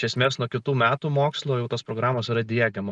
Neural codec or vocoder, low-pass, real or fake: none; 10.8 kHz; real